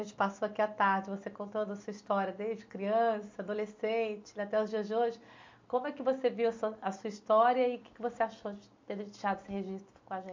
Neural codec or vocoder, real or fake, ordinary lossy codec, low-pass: none; real; MP3, 48 kbps; 7.2 kHz